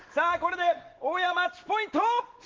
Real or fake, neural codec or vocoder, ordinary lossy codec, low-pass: real; none; Opus, 16 kbps; 7.2 kHz